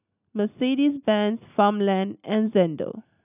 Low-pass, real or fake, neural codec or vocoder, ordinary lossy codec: 3.6 kHz; real; none; none